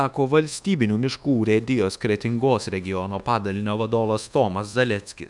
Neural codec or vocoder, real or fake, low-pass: codec, 24 kHz, 1.2 kbps, DualCodec; fake; 10.8 kHz